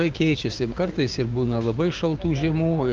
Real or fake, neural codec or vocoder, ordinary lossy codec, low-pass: fake; codec, 16 kHz, 6 kbps, DAC; Opus, 24 kbps; 7.2 kHz